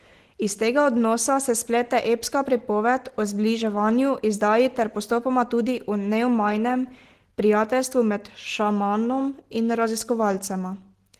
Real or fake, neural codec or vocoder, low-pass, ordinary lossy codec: real; none; 14.4 kHz; Opus, 16 kbps